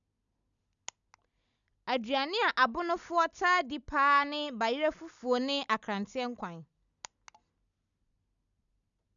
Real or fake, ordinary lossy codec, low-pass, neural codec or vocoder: real; none; 7.2 kHz; none